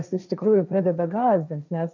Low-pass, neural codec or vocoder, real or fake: 7.2 kHz; codec, 16 kHz, 1.1 kbps, Voila-Tokenizer; fake